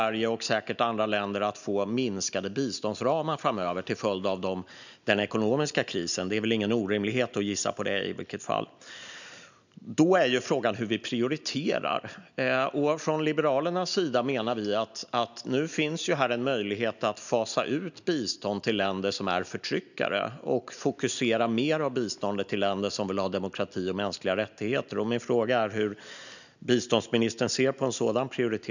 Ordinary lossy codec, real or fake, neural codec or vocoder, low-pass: none; real; none; 7.2 kHz